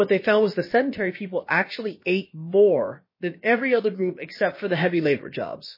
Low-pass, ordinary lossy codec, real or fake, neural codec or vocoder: 5.4 kHz; MP3, 24 kbps; fake; codec, 16 kHz, about 1 kbps, DyCAST, with the encoder's durations